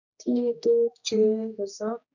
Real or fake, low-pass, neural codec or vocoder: fake; 7.2 kHz; codec, 16 kHz, 1 kbps, X-Codec, HuBERT features, trained on general audio